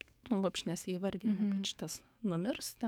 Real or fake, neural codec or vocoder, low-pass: fake; codec, 44.1 kHz, 7.8 kbps, DAC; 19.8 kHz